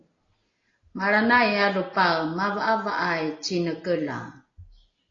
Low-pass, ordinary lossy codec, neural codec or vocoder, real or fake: 7.2 kHz; AAC, 32 kbps; none; real